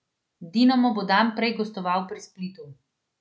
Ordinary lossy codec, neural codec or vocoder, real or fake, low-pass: none; none; real; none